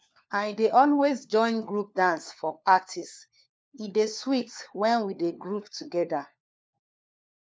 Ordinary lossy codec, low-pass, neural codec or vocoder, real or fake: none; none; codec, 16 kHz, 4 kbps, FunCodec, trained on LibriTTS, 50 frames a second; fake